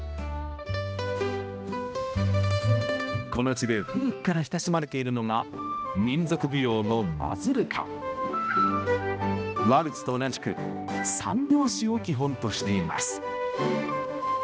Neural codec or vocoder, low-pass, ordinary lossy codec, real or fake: codec, 16 kHz, 1 kbps, X-Codec, HuBERT features, trained on balanced general audio; none; none; fake